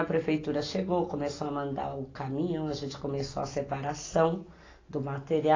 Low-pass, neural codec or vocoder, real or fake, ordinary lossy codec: 7.2 kHz; none; real; AAC, 32 kbps